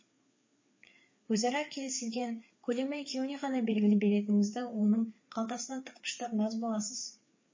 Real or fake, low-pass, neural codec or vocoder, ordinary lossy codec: fake; 7.2 kHz; codec, 16 kHz, 4 kbps, FreqCodec, larger model; MP3, 32 kbps